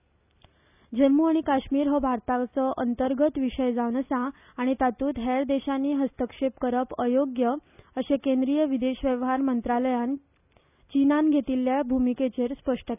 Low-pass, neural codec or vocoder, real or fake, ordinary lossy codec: 3.6 kHz; none; real; none